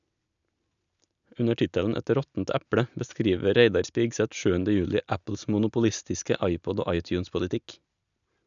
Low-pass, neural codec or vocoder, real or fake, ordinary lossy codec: 7.2 kHz; none; real; none